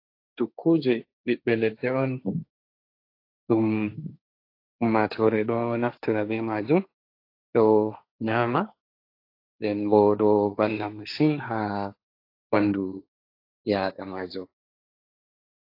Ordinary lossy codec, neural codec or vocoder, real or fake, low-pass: AAC, 32 kbps; codec, 16 kHz, 1.1 kbps, Voila-Tokenizer; fake; 5.4 kHz